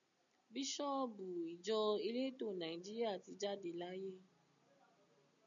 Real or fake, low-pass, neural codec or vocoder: real; 7.2 kHz; none